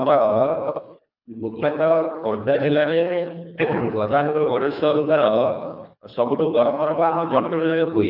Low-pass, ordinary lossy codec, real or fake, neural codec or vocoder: 5.4 kHz; none; fake; codec, 24 kHz, 1.5 kbps, HILCodec